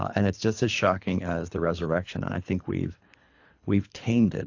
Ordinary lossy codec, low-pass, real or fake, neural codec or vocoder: AAC, 48 kbps; 7.2 kHz; fake; codec, 24 kHz, 3 kbps, HILCodec